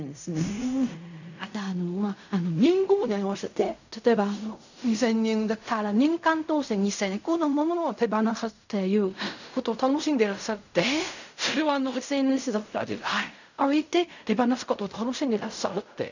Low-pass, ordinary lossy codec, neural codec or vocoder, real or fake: 7.2 kHz; none; codec, 16 kHz in and 24 kHz out, 0.4 kbps, LongCat-Audio-Codec, fine tuned four codebook decoder; fake